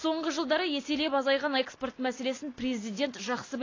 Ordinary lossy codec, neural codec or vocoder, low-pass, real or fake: AAC, 32 kbps; none; 7.2 kHz; real